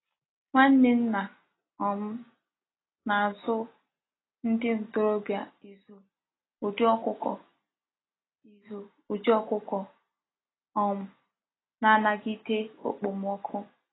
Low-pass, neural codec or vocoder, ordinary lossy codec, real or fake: 7.2 kHz; none; AAC, 16 kbps; real